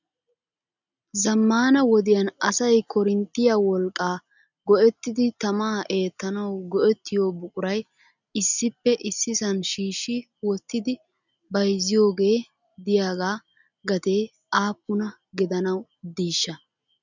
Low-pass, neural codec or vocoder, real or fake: 7.2 kHz; none; real